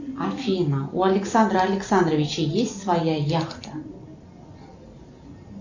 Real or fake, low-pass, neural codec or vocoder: real; 7.2 kHz; none